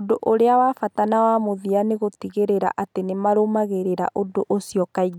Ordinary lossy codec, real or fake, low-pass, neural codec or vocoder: none; real; 19.8 kHz; none